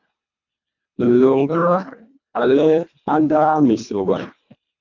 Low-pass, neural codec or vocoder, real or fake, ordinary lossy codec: 7.2 kHz; codec, 24 kHz, 1.5 kbps, HILCodec; fake; MP3, 64 kbps